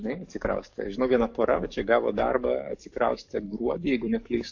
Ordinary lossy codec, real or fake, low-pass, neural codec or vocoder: AAC, 48 kbps; fake; 7.2 kHz; codec, 44.1 kHz, 7.8 kbps, DAC